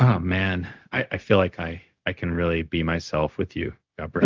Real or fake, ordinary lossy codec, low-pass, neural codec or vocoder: fake; Opus, 32 kbps; 7.2 kHz; codec, 16 kHz, 0.4 kbps, LongCat-Audio-Codec